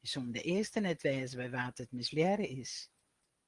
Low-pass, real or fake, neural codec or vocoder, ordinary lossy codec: 10.8 kHz; real; none; Opus, 24 kbps